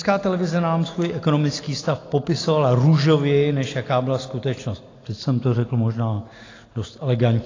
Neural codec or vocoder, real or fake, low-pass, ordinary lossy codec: none; real; 7.2 kHz; AAC, 32 kbps